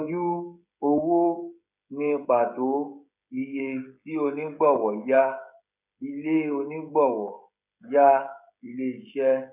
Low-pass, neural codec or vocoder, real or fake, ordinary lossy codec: 3.6 kHz; codec, 16 kHz, 16 kbps, FreqCodec, smaller model; fake; none